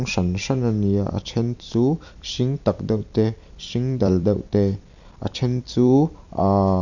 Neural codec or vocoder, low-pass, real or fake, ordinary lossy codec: vocoder, 44.1 kHz, 128 mel bands every 256 samples, BigVGAN v2; 7.2 kHz; fake; none